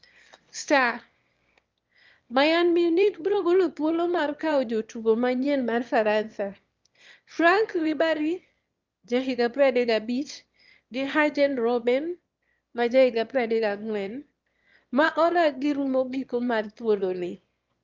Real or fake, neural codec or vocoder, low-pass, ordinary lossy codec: fake; autoencoder, 22.05 kHz, a latent of 192 numbers a frame, VITS, trained on one speaker; 7.2 kHz; Opus, 24 kbps